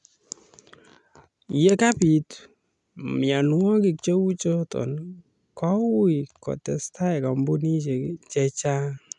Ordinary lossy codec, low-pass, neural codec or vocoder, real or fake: none; 10.8 kHz; none; real